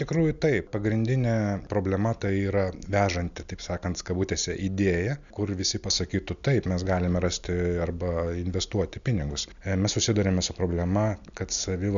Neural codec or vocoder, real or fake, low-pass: none; real; 7.2 kHz